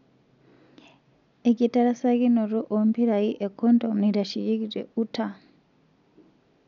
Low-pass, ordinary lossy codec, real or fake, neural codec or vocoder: 7.2 kHz; none; real; none